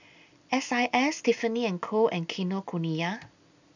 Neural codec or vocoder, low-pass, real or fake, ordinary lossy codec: none; 7.2 kHz; real; none